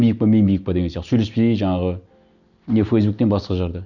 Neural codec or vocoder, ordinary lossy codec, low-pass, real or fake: none; none; 7.2 kHz; real